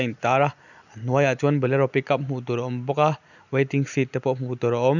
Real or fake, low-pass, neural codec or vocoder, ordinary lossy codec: real; 7.2 kHz; none; none